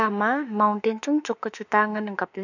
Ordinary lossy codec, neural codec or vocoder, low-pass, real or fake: none; autoencoder, 48 kHz, 32 numbers a frame, DAC-VAE, trained on Japanese speech; 7.2 kHz; fake